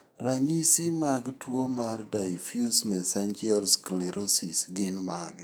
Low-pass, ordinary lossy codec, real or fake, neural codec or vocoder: none; none; fake; codec, 44.1 kHz, 2.6 kbps, SNAC